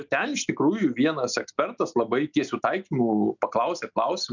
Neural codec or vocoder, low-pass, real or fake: none; 7.2 kHz; real